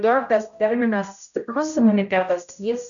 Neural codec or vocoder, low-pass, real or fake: codec, 16 kHz, 0.5 kbps, X-Codec, HuBERT features, trained on general audio; 7.2 kHz; fake